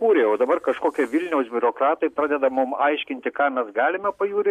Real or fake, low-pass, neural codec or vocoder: real; 14.4 kHz; none